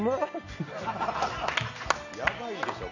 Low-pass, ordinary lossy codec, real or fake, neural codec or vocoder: 7.2 kHz; none; real; none